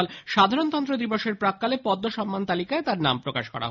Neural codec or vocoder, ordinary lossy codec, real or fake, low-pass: none; none; real; 7.2 kHz